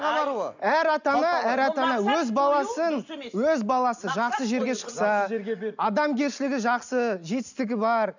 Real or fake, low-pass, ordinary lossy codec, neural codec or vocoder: real; 7.2 kHz; none; none